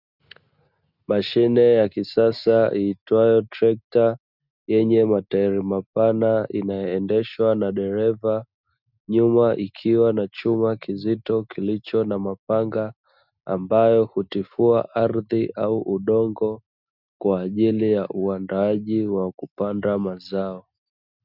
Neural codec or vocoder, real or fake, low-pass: none; real; 5.4 kHz